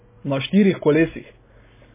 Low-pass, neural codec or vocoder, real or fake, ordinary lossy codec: 3.6 kHz; none; real; MP3, 16 kbps